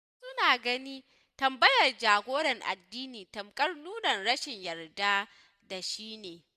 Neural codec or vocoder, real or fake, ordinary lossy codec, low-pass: none; real; none; 14.4 kHz